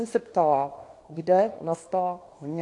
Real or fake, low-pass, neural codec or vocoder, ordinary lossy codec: fake; 10.8 kHz; codec, 24 kHz, 0.9 kbps, WavTokenizer, small release; AAC, 48 kbps